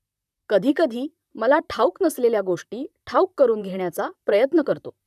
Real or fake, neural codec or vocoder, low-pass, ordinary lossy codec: fake; vocoder, 44.1 kHz, 128 mel bands, Pupu-Vocoder; 14.4 kHz; none